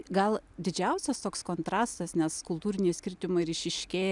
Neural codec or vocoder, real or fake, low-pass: none; real; 10.8 kHz